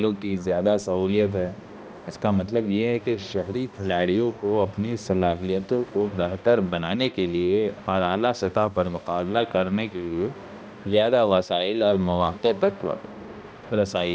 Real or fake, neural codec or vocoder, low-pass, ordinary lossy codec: fake; codec, 16 kHz, 1 kbps, X-Codec, HuBERT features, trained on balanced general audio; none; none